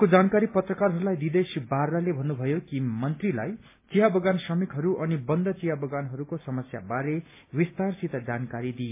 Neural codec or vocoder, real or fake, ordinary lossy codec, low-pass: none; real; MP3, 24 kbps; 3.6 kHz